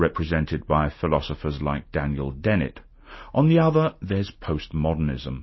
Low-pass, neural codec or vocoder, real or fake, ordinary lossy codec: 7.2 kHz; none; real; MP3, 24 kbps